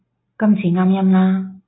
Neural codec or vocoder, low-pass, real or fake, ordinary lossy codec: none; 7.2 kHz; real; AAC, 16 kbps